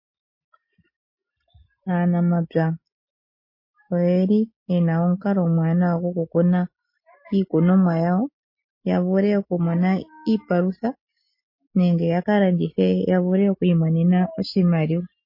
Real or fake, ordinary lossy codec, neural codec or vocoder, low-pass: real; MP3, 24 kbps; none; 5.4 kHz